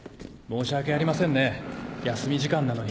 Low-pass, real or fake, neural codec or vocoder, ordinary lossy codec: none; real; none; none